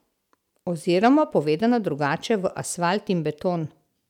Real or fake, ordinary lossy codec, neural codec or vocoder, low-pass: real; none; none; 19.8 kHz